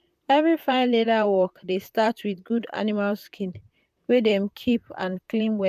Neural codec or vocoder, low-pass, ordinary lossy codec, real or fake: vocoder, 44.1 kHz, 128 mel bands, Pupu-Vocoder; 14.4 kHz; none; fake